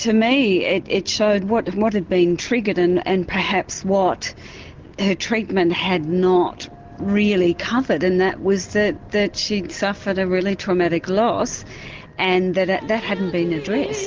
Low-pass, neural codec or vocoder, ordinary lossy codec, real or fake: 7.2 kHz; none; Opus, 16 kbps; real